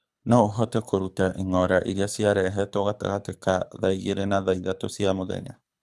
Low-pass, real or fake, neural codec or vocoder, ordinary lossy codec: none; fake; codec, 24 kHz, 6 kbps, HILCodec; none